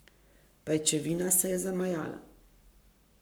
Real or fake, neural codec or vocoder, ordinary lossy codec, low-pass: fake; codec, 44.1 kHz, 7.8 kbps, Pupu-Codec; none; none